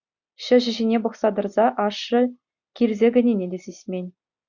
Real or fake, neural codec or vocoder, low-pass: real; none; 7.2 kHz